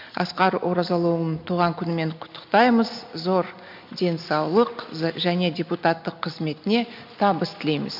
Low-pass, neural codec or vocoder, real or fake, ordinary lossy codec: 5.4 kHz; none; real; MP3, 48 kbps